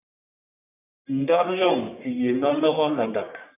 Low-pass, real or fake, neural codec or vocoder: 3.6 kHz; fake; codec, 44.1 kHz, 1.7 kbps, Pupu-Codec